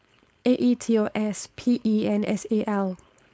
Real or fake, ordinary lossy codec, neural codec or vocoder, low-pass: fake; none; codec, 16 kHz, 4.8 kbps, FACodec; none